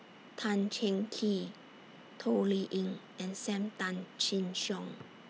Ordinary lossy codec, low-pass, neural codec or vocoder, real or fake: none; none; none; real